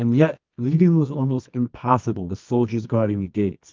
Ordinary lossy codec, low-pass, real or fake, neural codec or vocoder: Opus, 24 kbps; 7.2 kHz; fake; codec, 24 kHz, 0.9 kbps, WavTokenizer, medium music audio release